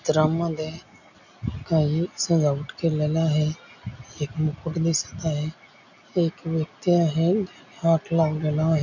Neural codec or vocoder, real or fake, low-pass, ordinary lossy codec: none; real; 7.2 kHz; none